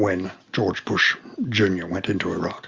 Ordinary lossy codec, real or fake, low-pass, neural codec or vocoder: Opus, 32 kbps; real; 7.2 kHz; none